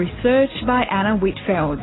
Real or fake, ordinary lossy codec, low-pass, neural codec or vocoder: real; AAC, 16 kbps; 7.2 kHz; none